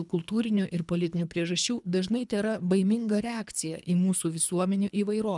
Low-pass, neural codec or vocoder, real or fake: 10.8 kHz; codec, 24 kHz, 3 kbps, HILCodec; fake